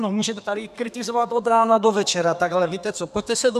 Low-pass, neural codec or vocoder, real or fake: 14.4 kHz; codec, 32 kHz, 1.9 kbps, SNAC; fake